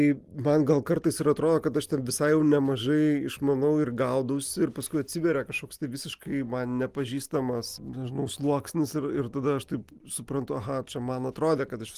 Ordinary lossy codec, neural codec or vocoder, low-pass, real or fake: Opus, 24 kbps; none; 14.4 kHz; real